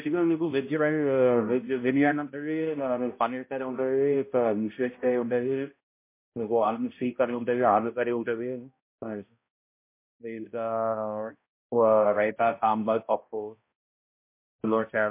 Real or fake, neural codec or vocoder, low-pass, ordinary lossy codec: fake; codec, 16 kHz, 0.5 kbps, X-Codec, HuBERT features, trained on balanced general audio; 3.6 kHz; MP3, 24 kbps